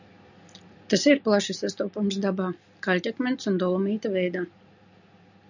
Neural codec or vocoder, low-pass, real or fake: none; 7.2 kHz; real